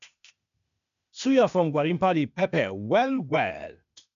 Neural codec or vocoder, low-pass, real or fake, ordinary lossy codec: codec, 16 kHz, 0.8 kbps, ZipCodec; 7.2 kHz; fake; none